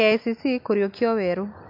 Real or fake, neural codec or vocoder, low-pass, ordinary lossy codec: real; none; 5.4 kHz; MP3, 48 kbps